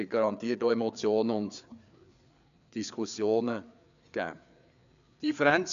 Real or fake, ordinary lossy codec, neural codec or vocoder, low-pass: fake; none; codec, 16 kHz, 4 kbps, FreqCodec, larger model; 7.2 kHz